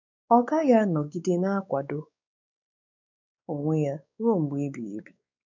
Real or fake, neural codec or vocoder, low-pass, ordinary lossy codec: fake; codec, 16 kHz, 4 kbps, X-Codec, WavLM features, trained on Multilingual LibriSpeech; 7.2 kHz; none